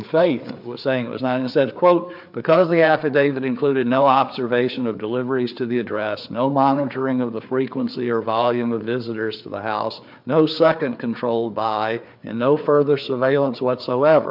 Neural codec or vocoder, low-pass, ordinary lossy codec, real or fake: codec, 16 kHz, 4 kbps, FreqCodec, larger model; 5.4 kHz; MP3, 48 kbps; fake